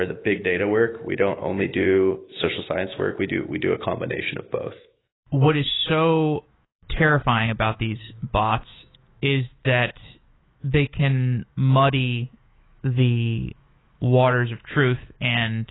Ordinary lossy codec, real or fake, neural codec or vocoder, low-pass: AAC, 16 kbps; fake; autoencoder, 48 kHz, 128 numbers a frame, DAC-VAE, trained on Japanese speech; 7.2 kHz